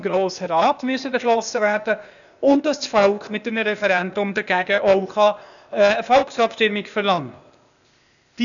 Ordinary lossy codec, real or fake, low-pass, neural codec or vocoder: none; fake; 7.2 kHz; codec, 16 kHz, 0.8 kbps, ZipCodec